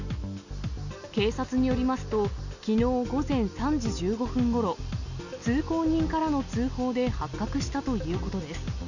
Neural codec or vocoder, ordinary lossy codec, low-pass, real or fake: none; none; 7.2 kHz; real